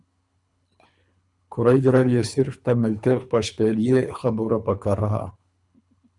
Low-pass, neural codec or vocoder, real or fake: 10.8 kHz; codec, 24 kHz, 3 kbps, HILCodec; fake